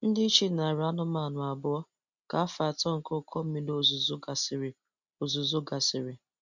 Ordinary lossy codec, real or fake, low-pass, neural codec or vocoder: none; real; 7.2 kHz; none